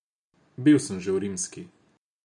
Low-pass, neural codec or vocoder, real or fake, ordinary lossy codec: 10.8 kHz; none; real; none